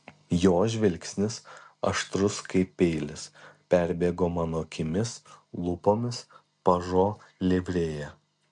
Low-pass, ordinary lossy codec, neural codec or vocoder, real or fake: 9.9 kHz; MP3, 64 kbps; none; real